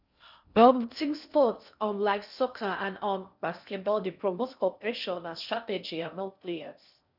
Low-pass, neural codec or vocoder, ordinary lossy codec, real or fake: 5.4 kHz; codec, 16 kHz in and 24 kHz out, 0.6 kbps, FocalCodec, streaming, 2048 codes; none; fake